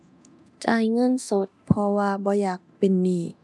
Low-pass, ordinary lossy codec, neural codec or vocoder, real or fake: none; none; codec, 24 kHz, 0.9 kbps, DualCodec; fake